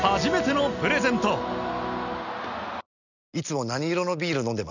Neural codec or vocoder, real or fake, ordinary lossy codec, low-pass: none; real; none; 7.2 kHz